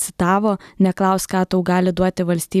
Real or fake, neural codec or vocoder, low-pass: real; none; 14.4 kHz